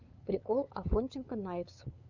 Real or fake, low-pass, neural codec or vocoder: fake; 7.2 kHz; codec, 16 kHz, 8 kbps, FunCodec, trained on LibriTTS, 25 frames a second